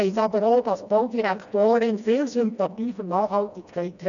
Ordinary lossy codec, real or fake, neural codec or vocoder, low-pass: none; fake; codec, 16 kHz, 1 kbps, FreqCodec, smaller model; 7.2 kHz